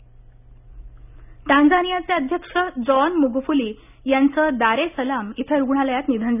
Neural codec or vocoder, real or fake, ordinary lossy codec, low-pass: none; real; none; 3.6 kHz